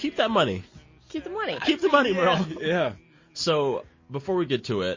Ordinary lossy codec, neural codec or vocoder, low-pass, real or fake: MP3, 32 kbps; none; 7.2 kHz; real